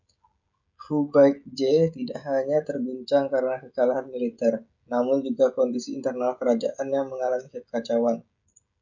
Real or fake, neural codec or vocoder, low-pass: fake; codec, 16 kHz, 16 kbps, FreqCodec, smaller model; 7.2 kHz